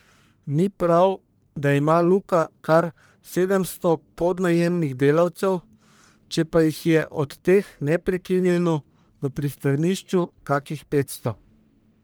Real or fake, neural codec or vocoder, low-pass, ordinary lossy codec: fake; codec, 44.1 kHz, 1.7 kbps, Pupu-Codec; none; none